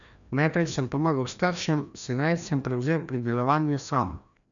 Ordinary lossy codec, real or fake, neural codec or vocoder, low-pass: none; fake; codec, 16 kHz, 1 kbps, FreqCodec, larger model; 7.2 kHz